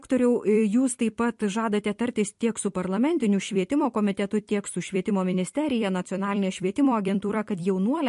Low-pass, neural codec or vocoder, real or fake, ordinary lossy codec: 14.4 kHz; vocoder, 44.1 kHz, 128 mel bands every 256 samples, BigVGAN v2; fake; MP3, 48 kbps